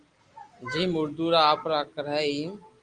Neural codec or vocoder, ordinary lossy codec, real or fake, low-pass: none; Opus, 32 kbps; real; 9.9 kHz